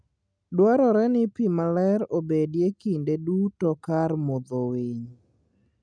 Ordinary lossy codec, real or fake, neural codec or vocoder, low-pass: none; real; none; 9.9 kHz